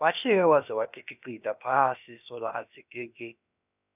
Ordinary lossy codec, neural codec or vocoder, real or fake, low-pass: none; codec, 16 kHz, about 1 kbps, DyCAST, with the encoder's durations; fake; 3.6 kHz